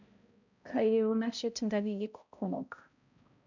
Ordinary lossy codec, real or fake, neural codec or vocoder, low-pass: none; fake; codec, 16 kHz, 0.5 kbps, X-Codec, HuBERT features, trained on balanced general audio; 7.2 kHz